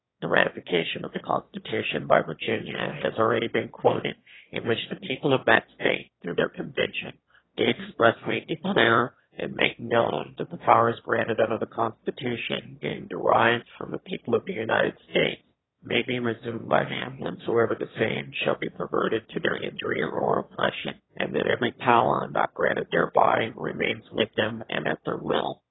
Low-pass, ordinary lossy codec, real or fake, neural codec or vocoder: 7.2 kHz; AAC, 16 kbps; fake; autoencoder, 22.05 kHz, a latent of 192 numbers a frame, VITS, trained on one speaker